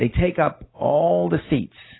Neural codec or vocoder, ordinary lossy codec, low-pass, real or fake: none; AAC, 16 kbps; 7.2 kHz; real